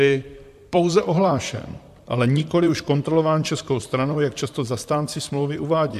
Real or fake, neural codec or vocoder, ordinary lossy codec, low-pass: fake; vocoder, 44.1 kHz, 128 mel bands, Pupu-Vocoder; AAC, 96 kbps; 14.4 kHz